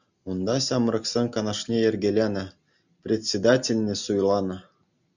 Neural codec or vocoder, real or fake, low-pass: none; real; 7.2 kHz